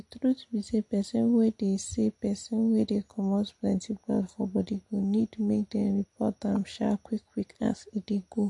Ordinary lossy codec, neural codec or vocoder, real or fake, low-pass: MP3, 48 kbps; none; real; 10.8 kHz